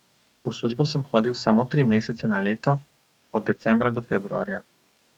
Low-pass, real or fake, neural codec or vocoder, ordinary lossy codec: 19.8 kHz; fake; codec, 44.1 kHz, 2.6 kbps, DAC; none